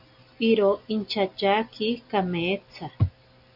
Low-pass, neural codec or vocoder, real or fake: 5.4 kHz; none; real